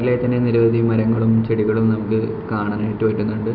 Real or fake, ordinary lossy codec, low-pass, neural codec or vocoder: real; none; 5.4 kHz; none